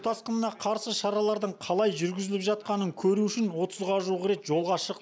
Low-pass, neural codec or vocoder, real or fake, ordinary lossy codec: none; none; real; none